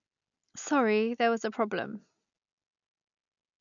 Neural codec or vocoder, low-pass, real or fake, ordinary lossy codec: none; 7.2 kHz; real; none